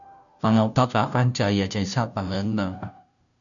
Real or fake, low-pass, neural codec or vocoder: fake; 7.2 kHz; codec, 16 kHz, 0.5 kbps, FunCodec, trained on Chinese and English, 25 frames a second